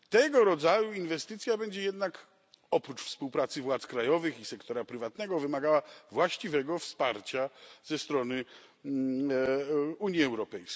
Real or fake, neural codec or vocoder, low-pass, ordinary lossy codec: real; none; none; none